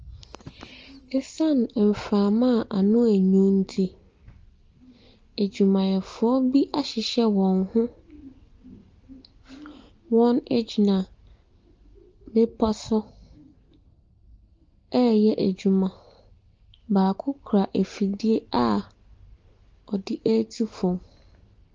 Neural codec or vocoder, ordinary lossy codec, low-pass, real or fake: none; Opus, 24 kbps; 7.2 kHz; real